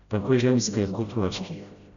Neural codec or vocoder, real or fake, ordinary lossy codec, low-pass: codec, 16 kHz, 0.5 kbps, FreqCodec, smaller model; fake; none; 7.2 kHz